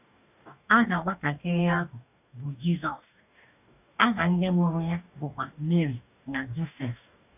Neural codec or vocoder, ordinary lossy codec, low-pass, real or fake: codec, 44.1 kHz, 2.6 kbps, DAC; none; 3.6 kHz; fake